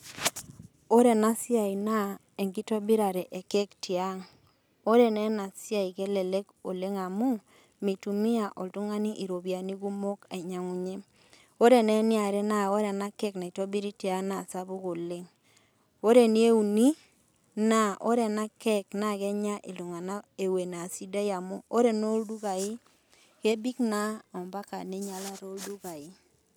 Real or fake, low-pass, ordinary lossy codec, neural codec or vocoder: real; none; none; none